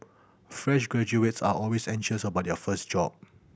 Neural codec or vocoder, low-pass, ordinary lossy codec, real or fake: none; none; none; real